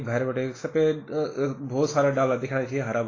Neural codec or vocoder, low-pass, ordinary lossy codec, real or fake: none; 7.2 kHz; AAC, 32 kbps; real